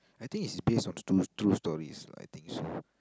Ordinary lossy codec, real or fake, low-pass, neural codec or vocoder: none; real; none; none